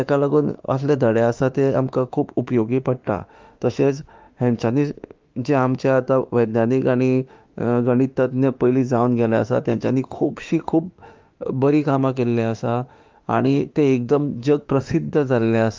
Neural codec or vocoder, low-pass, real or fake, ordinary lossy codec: autoencoder, 48 kHz, 32 numbers a frame, DAC-VAE, trained on Japanese speech; 7.2 kHz; fake; Opus, 32 kbps